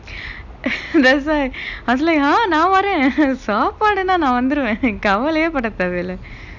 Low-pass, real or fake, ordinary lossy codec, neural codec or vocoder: 7.2 kHz; real; none; none